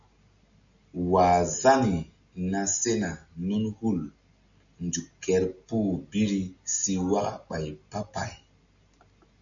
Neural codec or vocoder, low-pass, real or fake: none; 7.2 kHz; real